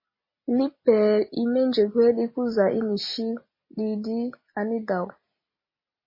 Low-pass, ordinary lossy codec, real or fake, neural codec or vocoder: 5.4 kHz; MP3, 24 kbps; real; none